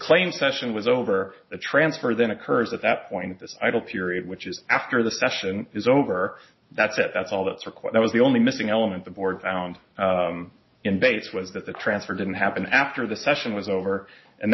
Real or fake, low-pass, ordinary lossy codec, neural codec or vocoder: real; 7.2 kHz; MP3, 24 kbps; none